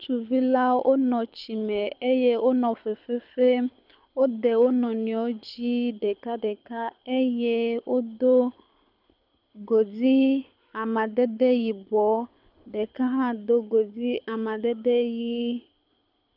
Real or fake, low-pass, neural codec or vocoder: fake; 5.4 kHz; codec, 24 kHz, 6 kbps, HILCodec